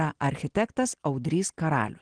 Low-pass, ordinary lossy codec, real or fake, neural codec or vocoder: 9.9 kHz; Opus, 16 kbps; real; none